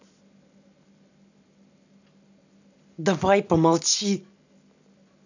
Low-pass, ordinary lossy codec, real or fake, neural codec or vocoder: 7.2 kHz; none; real; none